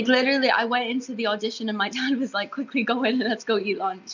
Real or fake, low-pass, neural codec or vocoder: real; 7.2 kHz; none